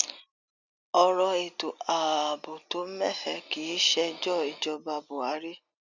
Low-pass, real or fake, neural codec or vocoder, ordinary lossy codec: 7.2 kHz; real; none; none